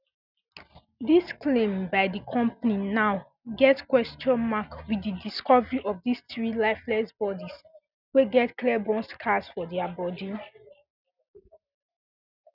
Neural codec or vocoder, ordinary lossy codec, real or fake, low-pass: none; none; real; 5.4 kHz